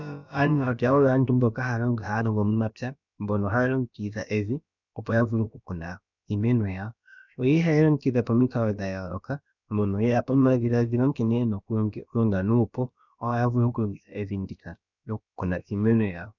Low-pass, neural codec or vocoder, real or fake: 7.2 kHz; codec, 16 kHz, about 1 kbps, DyCAST, with the encoder's durations; fake